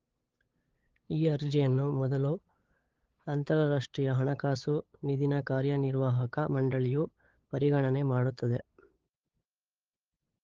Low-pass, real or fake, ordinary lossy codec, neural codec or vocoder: 7.2 kHz; fake; Opus, 16 kbps; codec, 16 kHz, 8 kbps, FunCodec, trained on LibriTTS, 25 frames a second